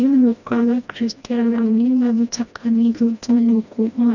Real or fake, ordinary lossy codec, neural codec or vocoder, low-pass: fake; none; codec, 16 kHz, 1 kbps, FreqCodec, smaller model; 7.2 kHz